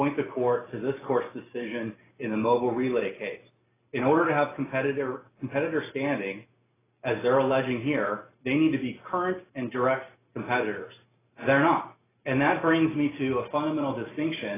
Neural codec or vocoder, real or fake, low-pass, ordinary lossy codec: none; real; 3.6 kHz; AAC, 16 kbps